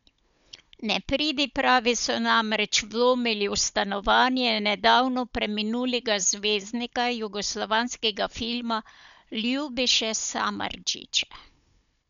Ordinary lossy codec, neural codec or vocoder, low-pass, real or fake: none; codec, 16 kHz, 16 kbps, FunCodec, trained on Chinese and English, 50 frames a second; 7.2 kHz; fake